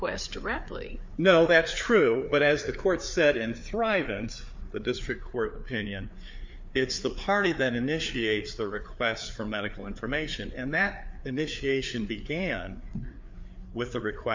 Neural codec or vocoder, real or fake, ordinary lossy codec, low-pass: codec, 16 kHz, 4 kbps, FreqCodec, larger model; fake; MP3, 64 kbps; 7.2 kHz